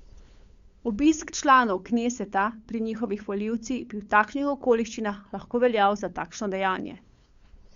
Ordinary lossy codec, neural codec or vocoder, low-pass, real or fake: Opus, 64 kbps; codec, 16 kHz, 8 kbps, FunCodec, trained on LibriTTS, 25 frames a second; 7.2 kHz; fake